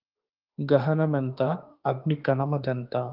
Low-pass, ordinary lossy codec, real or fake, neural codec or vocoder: 5.4 kHz; Opus, 32 kbps; fake; autoencoder, 48 kHz, 32 numbers a frame, DAC-VAE, trained on Japanese speech